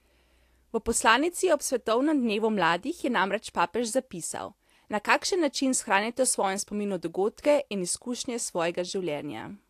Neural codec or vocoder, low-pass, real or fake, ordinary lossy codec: none; 14.4 kHz; real; AAC, 64 kbps